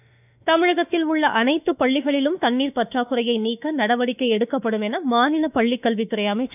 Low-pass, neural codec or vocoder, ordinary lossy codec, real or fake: 3.6 kHz; autoencoder, 48 kHz, 32 numbers a frame, DAC-VAE, trained on Japanese speech; none; fake